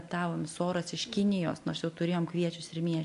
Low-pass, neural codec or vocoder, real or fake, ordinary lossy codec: 10.8 kHz; none; real; AAC, 64 kbps